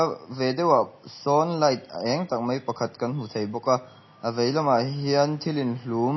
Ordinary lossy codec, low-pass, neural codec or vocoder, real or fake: MP3, 24 kbps; 7.2 kHz; none; real